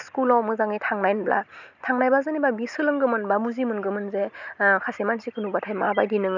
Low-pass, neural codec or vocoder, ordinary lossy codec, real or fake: 7.2 kHz; none; none; real